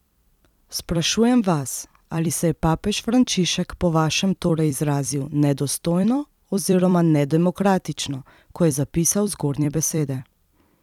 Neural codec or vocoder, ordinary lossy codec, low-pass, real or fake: vocoder, 44.1 kHz, 128 mel bands every 256 samples, BigVGAN v2; none; 19.8 kHz; fake